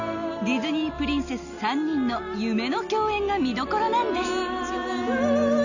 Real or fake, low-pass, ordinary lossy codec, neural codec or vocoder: real; 7.2 kHz; none; none